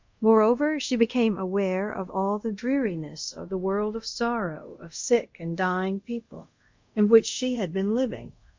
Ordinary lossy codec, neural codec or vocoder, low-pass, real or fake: MP3, 64 kbps; codec, 24 kHz, 0.5 kbps, DualCodec; 7.2 kHz; fake